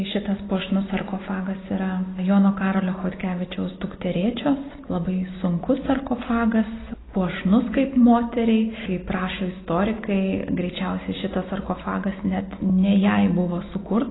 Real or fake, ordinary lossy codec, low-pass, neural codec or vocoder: real; AAC, 16 kbps; 7.2 kHz; none